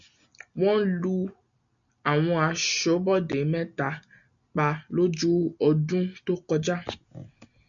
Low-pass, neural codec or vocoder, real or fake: 7.2 kHz; none; real